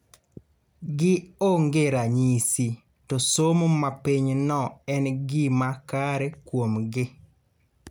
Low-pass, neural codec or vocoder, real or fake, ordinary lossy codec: none; none; real; none